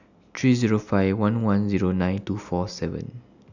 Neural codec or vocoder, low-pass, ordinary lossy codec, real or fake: none; 7.2 kHz; none; real